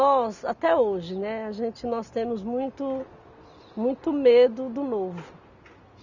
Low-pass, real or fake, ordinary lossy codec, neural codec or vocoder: 7.2 kHz; real; none; none